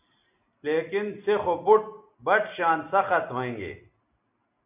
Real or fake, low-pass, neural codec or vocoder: real; 3.6 kHz; none